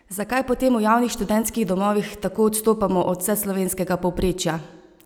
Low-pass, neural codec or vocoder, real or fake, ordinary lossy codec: none; none; real; none